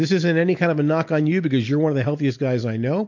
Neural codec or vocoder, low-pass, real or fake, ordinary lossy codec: none; 7.2 kHz; real; MP3, 48 kbps